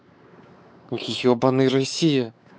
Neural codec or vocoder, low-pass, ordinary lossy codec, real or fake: codec, 16 kHz, 4 kbps, X-Codec, WavLM features, trained on Multilingual LibriSpeech; none; none; fake